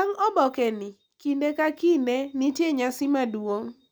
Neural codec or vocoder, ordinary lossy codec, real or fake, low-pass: none; none; real; none